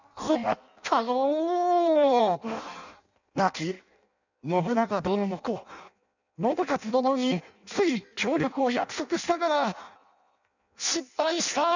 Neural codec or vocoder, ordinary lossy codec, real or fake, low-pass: codec, 16 kHz in and 24 kHz out, 0.6 kbps, FireRedTTS-2 codec; none; fake; 7.2 kHz